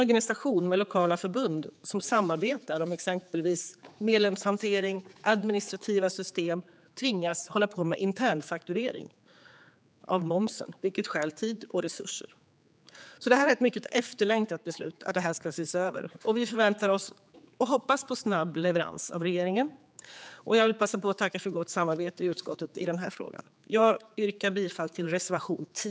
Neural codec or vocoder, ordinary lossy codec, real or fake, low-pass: codec, 16 kHz, 4 kbps, X-Codec, HuBERT features, trained on general audio; none; fake; none